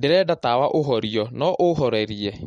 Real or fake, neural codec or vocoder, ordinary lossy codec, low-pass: real; none; MP3, 48 kbps; 10.8 kHz